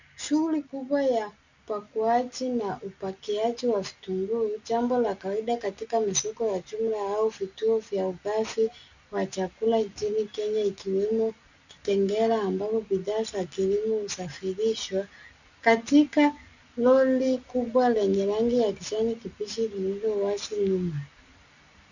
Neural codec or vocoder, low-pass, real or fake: none; 7.2 kHz; real